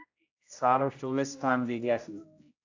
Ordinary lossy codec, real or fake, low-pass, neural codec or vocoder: AAC, 48 kbps; fake; 7.2 kHz; codec, 16 kHz, 0.5 kbps, X-Codec, HuBERT features, trained on general audio